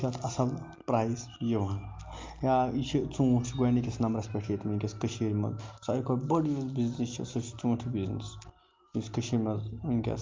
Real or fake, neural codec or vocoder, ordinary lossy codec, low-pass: real; none; Opus, 32 kbps; 7.2 kHz